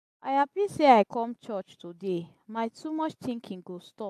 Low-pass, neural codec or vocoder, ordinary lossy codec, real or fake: 14.4 kHz; none; AAC, 96 kbps; real